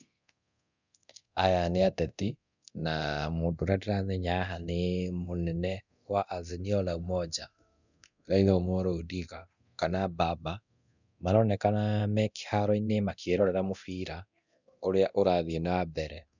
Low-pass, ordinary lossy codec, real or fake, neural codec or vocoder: 7.2 kHz; none; fake; codec, 24 kHz, 0.9 kbps, DualCodec